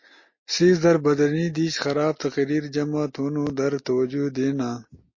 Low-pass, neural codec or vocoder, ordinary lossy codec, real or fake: 7.2 kHz; none; MP3, 32 kbps; real